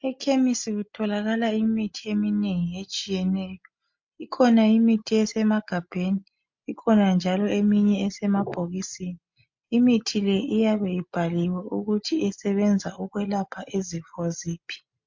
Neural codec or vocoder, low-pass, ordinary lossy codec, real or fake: none; 7.2 kHz; MP3, 48 kbps; real